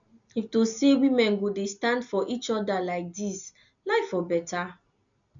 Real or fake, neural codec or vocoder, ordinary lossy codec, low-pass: real; none; none; 7.2 kHz